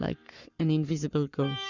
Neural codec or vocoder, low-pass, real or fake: codec, 44.1 kHz, 7.8 kbps, Pupu-Codec; 7.2 kHz; fake